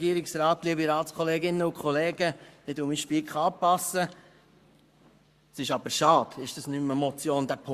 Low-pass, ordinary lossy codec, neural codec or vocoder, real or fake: 14.4 kHz; Opus, 64 kbps; codec, 44.1 kHz, 7.8 kbps, Pupu-Codec; fake